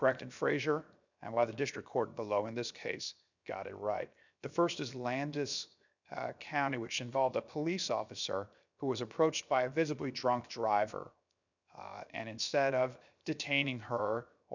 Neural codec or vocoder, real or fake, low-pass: codec, 16 kHz, 0.7 kbps, FocalCodec; fake; 7.2 kHz